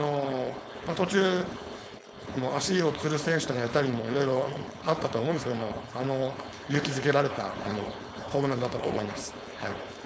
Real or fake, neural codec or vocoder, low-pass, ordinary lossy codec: fake; codec, 16 kHz, 4.8 kbps, FACodec; none; none